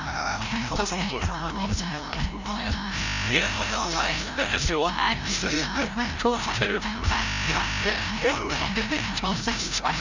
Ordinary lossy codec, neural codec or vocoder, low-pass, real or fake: Opus, 64 kbps; codec, 16 kHz, 0.5 kbps, FreqCodec, larger model; 7.2 kHz; fake